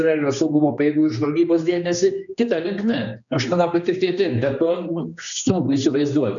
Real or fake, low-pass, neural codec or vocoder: fake; 7.2 kHz; codec, 16 kHz, 2 kbps, X-Codec, HuBERT features, trained on general audio